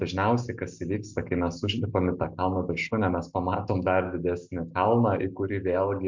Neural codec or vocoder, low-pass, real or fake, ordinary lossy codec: none; 7.2 kHz; real; MP3, 64 kbps